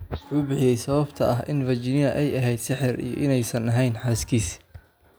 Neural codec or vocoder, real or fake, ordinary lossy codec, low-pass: none; real; none; none